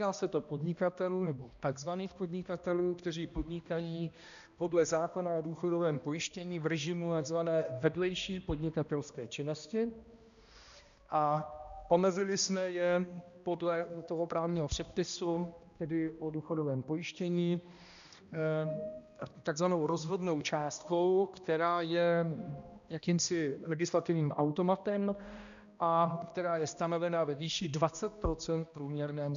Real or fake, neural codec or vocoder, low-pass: fake; codec, 16 kHz, 1 kbps, X-Codec, HuBERT features, trained on balanced general audio; 7.2 kHz